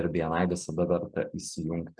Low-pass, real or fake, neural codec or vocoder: 9.9 kHz; real; none